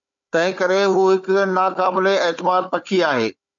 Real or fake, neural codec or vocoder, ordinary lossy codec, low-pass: fake; codec, 16 kHz, 4 kbps, FunCodec, trained on Chinese and English, 50 frames a second; MP3, 64 kbps; 7.2 kHz